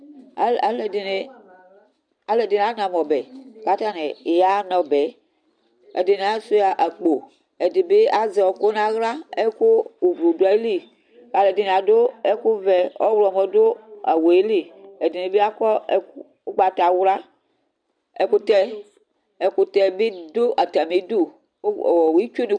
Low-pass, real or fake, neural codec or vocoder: 9.9 kHz; real; none